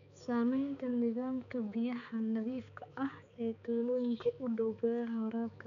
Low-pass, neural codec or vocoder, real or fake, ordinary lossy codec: 7.2 kHz; codec, 16 kHz, 4 kbps, X-Codec, HuBERT features, trained on balanced general audio; fake; none